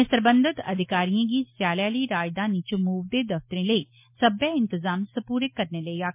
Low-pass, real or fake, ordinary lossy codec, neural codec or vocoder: 3.6 kHz; real; MP3, 24 kbps; none